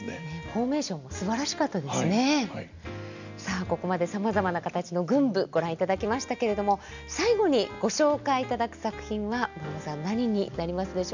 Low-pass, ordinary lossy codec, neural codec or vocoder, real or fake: 7.2 kHz; none; none; real